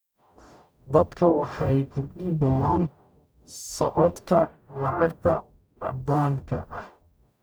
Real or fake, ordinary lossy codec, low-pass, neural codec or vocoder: fake; none; none; codec, 44.1 kHz, 0.9 kbps, DAC